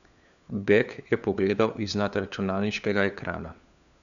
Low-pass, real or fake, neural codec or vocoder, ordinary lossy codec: 7.2 kHz; fake; codec, 16 kHz, 4 kbps, FunCodec, trained on LibriTTS, 50 frames a second; none